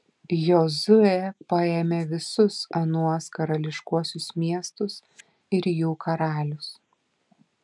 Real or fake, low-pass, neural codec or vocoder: real; 10.8 kHz; none